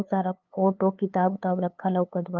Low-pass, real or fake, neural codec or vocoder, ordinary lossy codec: 7.2 kHz; fake; codec, 16 kHz, 2 kbps, FunCodec, trained on LibriTTS, 25 frames a second; Opus, 32 kbps